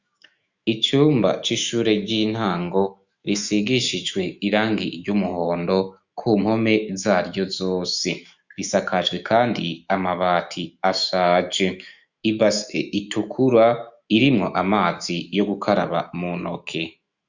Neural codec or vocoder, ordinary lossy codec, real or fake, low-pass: autoencoder, 48 kHz, 128 numbers a frame, DAC-VAE, trained on Japanese speech; Opus, 64 kbps; fake; 7.2 kHz